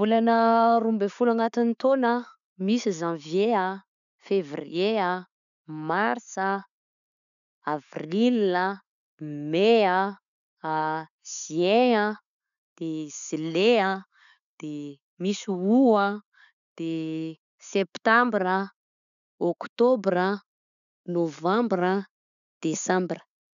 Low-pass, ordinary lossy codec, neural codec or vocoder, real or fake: 7.2 kHz; none; none; real